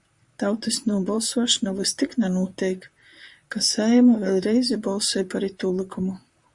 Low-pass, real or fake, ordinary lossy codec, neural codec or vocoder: 10.8 kHz; fake; Opus, 64 kbps; vocoder, 44.1 kHz, 128 mel bands, Pupu-Vocoder